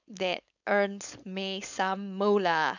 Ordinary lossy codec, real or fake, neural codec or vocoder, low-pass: none; fake; codec, 16 kHz, 4.8 kbps, FACodec; 7.2 kHz